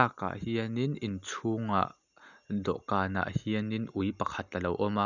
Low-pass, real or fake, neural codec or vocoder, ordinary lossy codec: 7.2 kHz; real; none; none